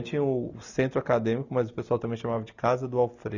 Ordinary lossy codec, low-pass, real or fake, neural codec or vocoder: none; 7.2 kHz; real; none